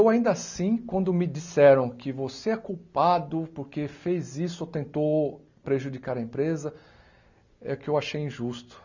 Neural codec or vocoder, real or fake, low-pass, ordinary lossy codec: none; real; 7.2 kHz; none